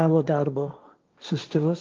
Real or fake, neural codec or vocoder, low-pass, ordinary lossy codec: fake; codec, 16 kHz, 1.1 kbps, Voila-Tokenizer; 7.2 kHz; Opus, 24 kbps